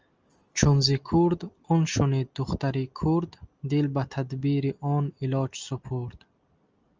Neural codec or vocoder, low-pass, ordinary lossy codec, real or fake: none; 7.2 kHz; Opus, 24 kbps; real